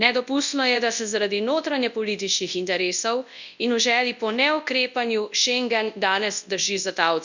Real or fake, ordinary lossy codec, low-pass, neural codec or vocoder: fake; none; 7.2 kHz; codec, 24 kHz, 0.9 kbps, WavTokenizer, large speech release